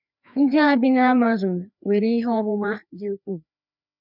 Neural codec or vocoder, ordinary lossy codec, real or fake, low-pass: codec, 16 kHz, 2 kbps, FreqCodec, larger model; none; fake; 5.4 kHz